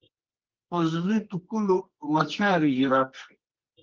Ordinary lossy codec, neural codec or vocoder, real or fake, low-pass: Opus, 16 kbps; codec, 24 kHz, 0.9 kbps, WavTokenizer, medium music audio release; fake; 7.2 kHz